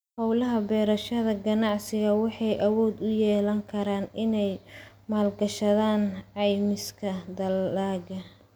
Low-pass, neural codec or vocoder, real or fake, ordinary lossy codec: none; none; real; none